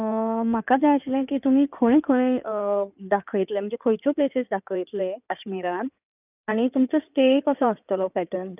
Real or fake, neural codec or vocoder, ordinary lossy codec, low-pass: fake; codec, 16 kHz in and 24 kHz out, 2.2 kbps, FireRedTTS-2 codec; none; 3.6 kHz